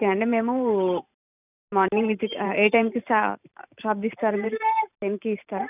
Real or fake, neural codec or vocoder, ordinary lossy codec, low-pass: fake; vocoder, 44.1 kHz, 128 mel bands every 512 samples, BigVGAN v2; none; 3.6 kHz